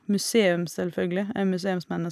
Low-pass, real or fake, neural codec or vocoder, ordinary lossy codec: 14.4 kHz; real; none; none